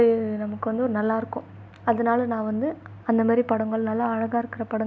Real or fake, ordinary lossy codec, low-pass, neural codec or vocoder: real; none; none; none